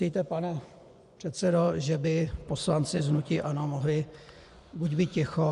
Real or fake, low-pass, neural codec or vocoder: fake; 10.8 kHz; vocoder, 24 kHz, 100 mel bands, Vocos